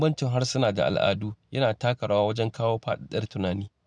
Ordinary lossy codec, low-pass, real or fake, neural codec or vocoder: none; none; real; none